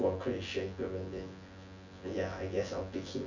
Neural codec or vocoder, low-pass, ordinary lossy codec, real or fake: vocoder, 24 kHz, 100 mel bands, Vocos; 7.2 kHz; none; fake